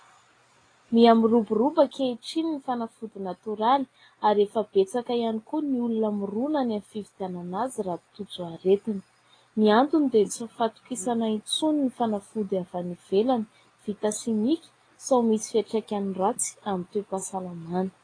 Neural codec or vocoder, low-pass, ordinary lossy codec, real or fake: none; 9.9 kHz; AAC, 32 kbps; real